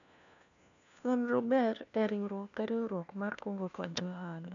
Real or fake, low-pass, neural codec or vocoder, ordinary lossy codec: fake; 7.2 kHz; codec, 16 kHz, 1 kbps, FunCodec, trained on LibriTTS, 50 frames a second; none